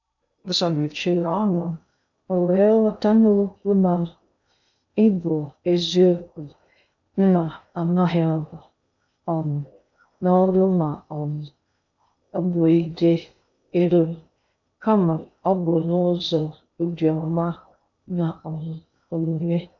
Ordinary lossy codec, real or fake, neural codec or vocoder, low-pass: none; fake; codec, 16 kHz in and 24 kHz out, 0.6 kbps, FocalCodec, streaming, 2048 codes; 7.2 kHz